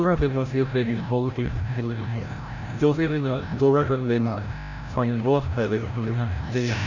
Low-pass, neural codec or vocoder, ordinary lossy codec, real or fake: 7.2 kHz; codec, 16 kHz, 0.5 kbps, FreqCodec, larger model; none; fake